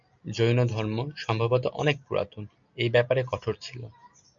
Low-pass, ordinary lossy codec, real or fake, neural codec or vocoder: 7.2 kHz; MP3, 64 kbps; real; none